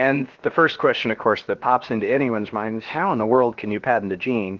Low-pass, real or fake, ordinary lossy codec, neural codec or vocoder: 7.2 kHz; fake; Opus, 16 kbps; codec, 16 kHz, about 1 kbps, DyCAST, with the encoder's durations